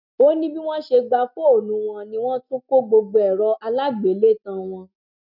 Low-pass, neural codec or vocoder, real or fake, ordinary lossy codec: 5.4 kHz; none; real; none